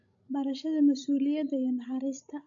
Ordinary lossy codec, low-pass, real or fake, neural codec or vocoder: AAC, 64 kbps; 7.2 kHz; fake; codec, 16 kHz, 16 kbps, FreqCodec, larger model